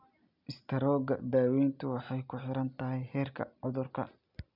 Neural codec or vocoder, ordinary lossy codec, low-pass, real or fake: none; none; 5.4 kHz; real